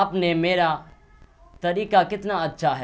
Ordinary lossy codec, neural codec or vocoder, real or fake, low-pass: none; none; real; none